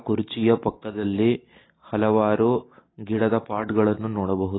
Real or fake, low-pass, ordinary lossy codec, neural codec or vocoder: real; 7.2 kHz; AAC, 16 kbps; none